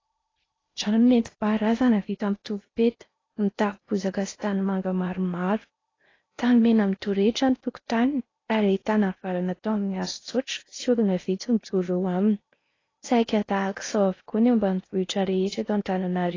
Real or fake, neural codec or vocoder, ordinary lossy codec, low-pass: fake; codec, 16 kHz in and 24 kHz out, 0.8 kbps, FocalCodec, streaming, 65536 codes; AAC, 32 kbps; 7.2 kHz